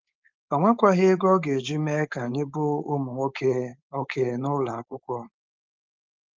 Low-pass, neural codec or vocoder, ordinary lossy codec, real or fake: 7.2 kHz; codec, 16 kHz, 4.8 kbps, FACodec; Opus, 32 kbps; fake